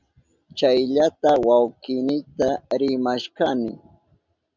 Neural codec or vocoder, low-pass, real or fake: none; 7.2 kHz; real